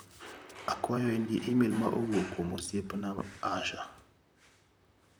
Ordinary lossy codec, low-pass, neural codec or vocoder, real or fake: none; none; vocoder, 44.1 kHz, 128 mel bands, Pupu-Vocoder; fake